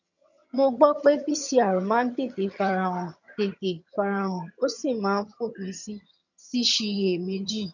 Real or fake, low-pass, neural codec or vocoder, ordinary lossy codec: fake; 7.2 kHz; vocoder, 22.05 kHz, 80 mel bands, HiFi-GAN; none